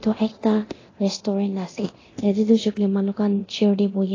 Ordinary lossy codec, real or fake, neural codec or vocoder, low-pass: AAC, 32 kbps; fake; codec, 24 kHz, 0.9 kbps, DualCodec; 7.2 kHz